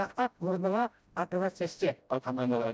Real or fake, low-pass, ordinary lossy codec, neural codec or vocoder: fake; none; none; codec, 16 kHz, 0.5 kbps, FreqCodec, smaller model